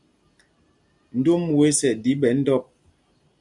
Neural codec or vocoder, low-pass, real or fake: none; 10.8 kHz; real